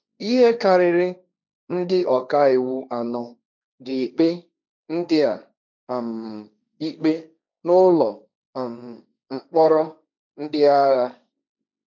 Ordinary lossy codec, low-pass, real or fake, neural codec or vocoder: none; 7.2 kHz; fake; codec, 16 kHz, 1.1 kbps, Voila-Tokenizer